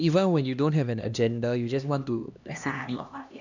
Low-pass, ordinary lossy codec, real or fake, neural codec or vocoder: 7.2 kHz; none; fake; codec, 16 kHz, 1 kbps, X-Codec, HuBERT features, trained on LibriSpeech